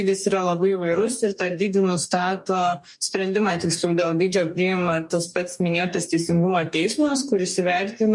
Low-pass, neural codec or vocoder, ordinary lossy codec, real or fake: 10.8 kHz; codec, 44.1 kHz, 2.6 kbps, DAC; MP3, 64 kbps; fake